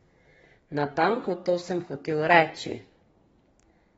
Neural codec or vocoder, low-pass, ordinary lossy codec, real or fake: codec, 32 kHz, 1.9 kbps, SNAC; 14.4 kHz; AAC, 24 kbps; fake